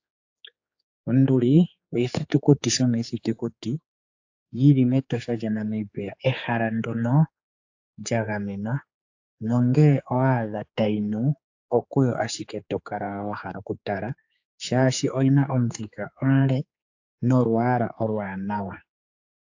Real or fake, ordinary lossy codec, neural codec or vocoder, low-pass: fake; AAC, 48 kbps; codec, 16 kHz, 4 kbps, X-Codec, HuBERT features, trained on general audio; 7.2 kHz